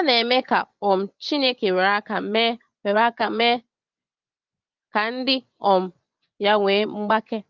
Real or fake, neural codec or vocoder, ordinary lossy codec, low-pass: fake; vocoder, 24 kHz, 100 mel bands, Vocos; Opus, 24 kbps; 7.2 kHz